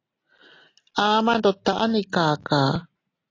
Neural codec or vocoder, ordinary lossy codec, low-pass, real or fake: none; AAC, 32 kbps; 7.2 kHz; real